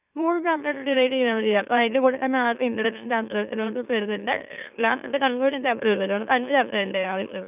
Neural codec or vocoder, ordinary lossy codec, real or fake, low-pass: autoencoder, 44.1 kHz, a latent of 192 numbers a frame, MeloTTS; none; fake; 3.6 kHz